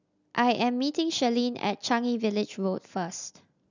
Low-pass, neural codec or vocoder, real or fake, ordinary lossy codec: 7.2 kHz; none; real; none